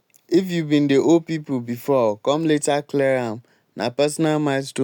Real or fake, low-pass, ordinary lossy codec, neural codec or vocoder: real; 19.8 kHz; none; none